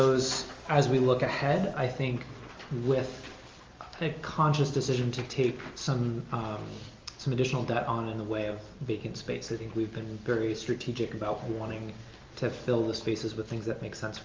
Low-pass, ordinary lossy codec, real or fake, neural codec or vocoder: 7.2 kHz; Opus, 32 kbps; real; none